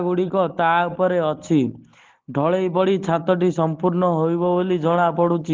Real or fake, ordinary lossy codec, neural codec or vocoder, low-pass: real; Opus, 16 kbps; none; 7.2 kHz